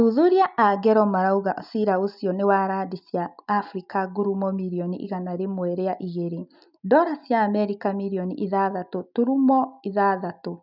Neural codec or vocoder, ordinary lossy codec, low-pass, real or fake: none; none; 5.4 kHz; real